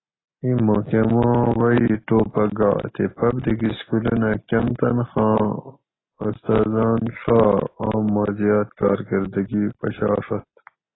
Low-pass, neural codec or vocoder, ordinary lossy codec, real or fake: 7.2 kHz; none; AAC, 16 kbps; real